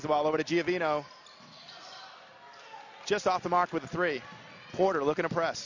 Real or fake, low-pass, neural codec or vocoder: real; 7.2 kHz; none